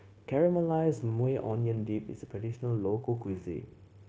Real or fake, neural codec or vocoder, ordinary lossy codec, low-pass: fake; codec, 16 kHz, 0.9 kbps, LongCat-Audio-Codec; none; none